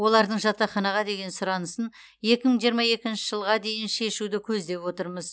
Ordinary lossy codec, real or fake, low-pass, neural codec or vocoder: none; real; none; none